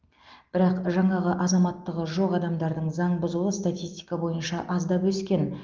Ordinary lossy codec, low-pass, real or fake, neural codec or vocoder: Opus, 32 kbps; 7.2 kHz; real; none